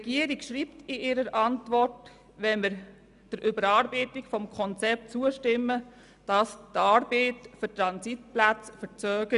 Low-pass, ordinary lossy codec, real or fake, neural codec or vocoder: 10.8 kHz; none; real; none